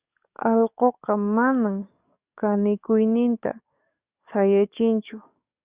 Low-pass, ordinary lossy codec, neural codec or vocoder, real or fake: 3.6 kHz; Opus, 64 kbps; codec, 44.1 kHz, 7.8 kbps, DAC; fake